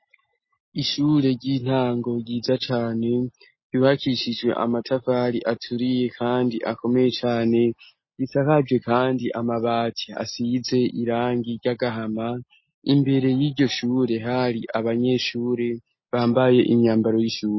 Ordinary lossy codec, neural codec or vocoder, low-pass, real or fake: MP3, 24 kbps; none; 7.2 kHz; real